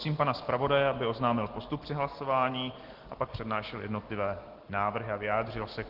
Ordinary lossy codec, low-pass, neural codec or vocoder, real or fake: Opus, 16 kbps; 5.4 kHz; none; real